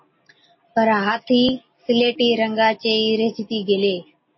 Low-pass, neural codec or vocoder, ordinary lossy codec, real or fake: 7.2 kHz; none; MP3, 24 kbps; real